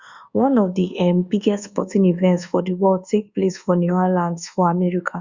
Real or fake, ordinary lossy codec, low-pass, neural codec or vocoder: fake; Opus, 64 kbps; 7.2 kHz; codec, 24 kHz, 1.2 kbps, DualCodec